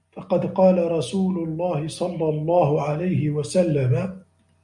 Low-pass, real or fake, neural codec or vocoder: 10.8 kHz; real; none